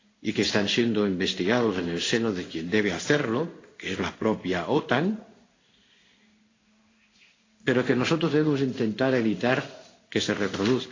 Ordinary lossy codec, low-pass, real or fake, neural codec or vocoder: AAC, 32 kbps; 7.2 kHz; fake; codec, 16 kHz in and 24 kHz out, 1 kbps, XY-Tokenizer